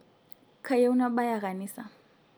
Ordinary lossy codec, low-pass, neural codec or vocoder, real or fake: none; none; none; real